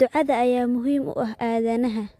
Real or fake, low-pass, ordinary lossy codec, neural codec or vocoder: real; 14.4 kHz; AAC, 64 kbps; none